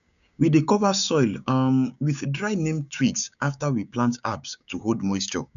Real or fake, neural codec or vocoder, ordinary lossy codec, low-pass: fake; codec, 16 kHz, 6 kbps, DAC; none; 7.2 kHz